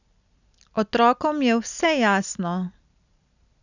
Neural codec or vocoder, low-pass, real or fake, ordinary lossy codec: none; 7.2 kHz; real; none